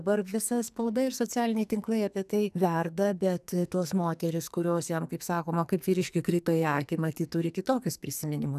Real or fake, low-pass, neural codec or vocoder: fake; 14.4 kHz; codec, 44.1 kHz, 2.6 kbps, SNAC